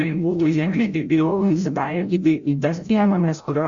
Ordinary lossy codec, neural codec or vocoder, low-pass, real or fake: Opus, 64 kbps; codec, 16 kHz, 0.5 kbps, FreqCodec, larger model; 7.2 kHz; fake